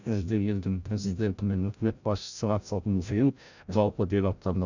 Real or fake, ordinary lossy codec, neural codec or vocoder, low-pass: fake; none; codec, 16 kHz, 0.5 kbps, FreqCodec, larger model; 7.2 kHz